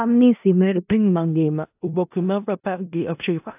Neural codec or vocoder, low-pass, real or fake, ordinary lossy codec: codec, 16 kHz in and 24 kHz out, 0.4 kbps, LongCat-Audio-Codec, four codebook decoder; 3.6 kHz; fake; AAC, 32 kbps